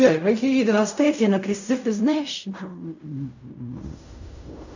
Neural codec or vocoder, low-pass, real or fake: codec, 16 kHz in and 24 kHz out, 0.4 kbps, LongCat-Audio-Codec, fine tuned four codebook decoder; 7.2 kHz; fake